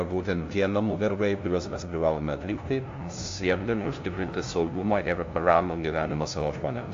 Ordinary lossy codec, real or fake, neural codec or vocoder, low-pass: AAC, 48 kbps; fake; codec, 16 kHz, 0.5 kbps, FunCodec, trained on LibriTTS, 25 frames a second; 7.2 kHz